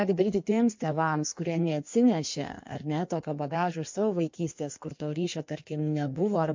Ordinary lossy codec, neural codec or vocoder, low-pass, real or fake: MP3, 48 kbps; codec, 16 kHz in and 24 kHz out, 1.1 kbps, FireRedTTS-2 codec; 7.2 kHz; fake